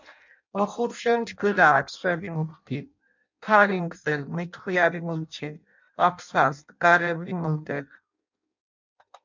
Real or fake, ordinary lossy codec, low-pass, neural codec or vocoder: fake; MP3, 64 kbps; 7.2 kHz; codec, 16 kHz in and 24 kHz out, 0.6 kbps, FireRedTTS-2 codec